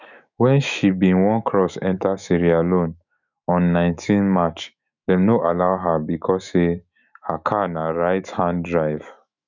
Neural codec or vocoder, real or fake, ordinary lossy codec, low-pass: autoencoder, 48 kHz, 128 numbers a frame, DAC-VAE, trained on Japanese speech; fake; none; 7.2 kHz